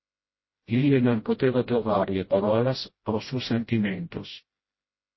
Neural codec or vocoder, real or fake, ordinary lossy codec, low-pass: codec, 16 kHz, 0.5 kbps, FreqCodec, smaller model; fake; MP3, 24 kbps; 7.2 kHz